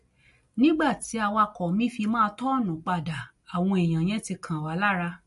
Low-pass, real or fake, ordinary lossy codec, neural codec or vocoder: 14.4 kHz; real; MP3, 48 kbps; none